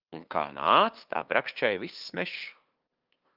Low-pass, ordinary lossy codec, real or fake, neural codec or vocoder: 5.4 kHz; Opus, 32 kbps; fake; codec, 16 kHz, 2 kbps, FunCodec, trained on LibriTTS, 25 frames a second